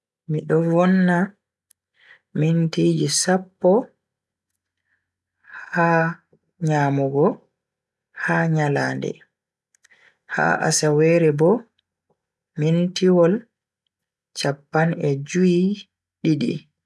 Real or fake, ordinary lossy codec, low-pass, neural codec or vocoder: real; none; none; none